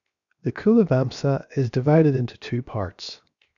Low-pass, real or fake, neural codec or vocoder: 7.2 kHz; fake; codec, 16 kHz, 0.7 kbps, FocalCodec